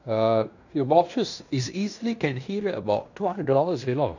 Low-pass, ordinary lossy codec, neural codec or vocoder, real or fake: 7.2 kHz; none; codec, 16 kHz in and 24 kHz out, 0.9 kbps, LongCat-Audio-Codec, fine tuned four codebook decoder; fake